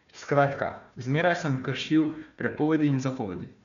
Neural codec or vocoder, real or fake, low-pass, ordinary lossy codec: codec, 16 kHz, 1 kbps, FunCodec, trained on Chinese and English, 50 frames a second; fake; 7.2 kHz; none